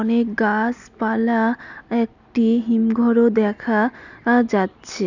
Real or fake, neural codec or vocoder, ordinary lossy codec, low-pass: real; none; none; 7.2 kHz